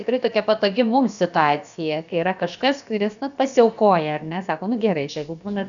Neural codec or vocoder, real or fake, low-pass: codec, 16 kHz, about 1 kbps, DyCAST, with the encoder's durations; fake; 7.2 kHz